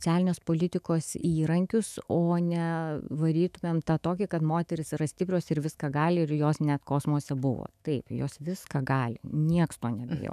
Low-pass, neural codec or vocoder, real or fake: 14.4 kHz; autoencoder, 48 kHz, 128 numbers a frame, DAC-VAE, trained on Japanese speech; fake